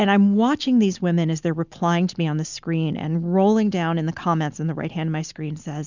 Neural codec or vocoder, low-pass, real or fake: none; 7.2 kHz; real